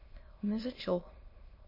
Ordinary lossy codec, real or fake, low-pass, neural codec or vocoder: MP3, 24 kbps; fake; 5.4 kHz; autoencoder, 22.05 kHz, a latent of 192 numbers a frame, VITS, trained on many speakers